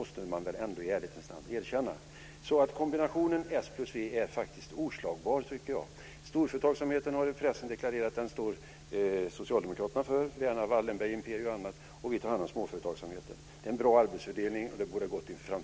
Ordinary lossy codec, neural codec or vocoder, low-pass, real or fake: none; none; none; real